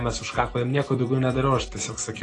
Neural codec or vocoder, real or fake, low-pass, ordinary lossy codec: none; real; 10.8 kHz; AAC, 32 kbps